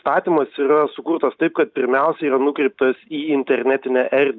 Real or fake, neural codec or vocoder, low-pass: real; none; 7.2 kHz